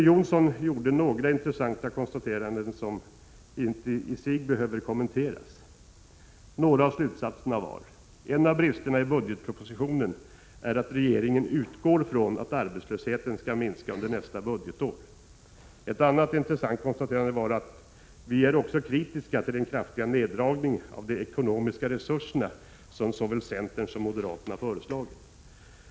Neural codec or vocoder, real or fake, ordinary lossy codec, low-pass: none; real; none; none